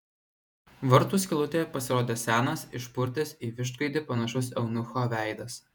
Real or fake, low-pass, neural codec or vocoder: fake; 19.8 kHz; vocoder, 48 kHz, 128 mel bands, Vocos